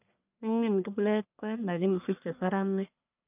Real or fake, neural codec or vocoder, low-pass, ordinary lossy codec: fake; codec, 44.1 kHz, 1.7 kbps, Pupu-Codec; 3.6 kHz; none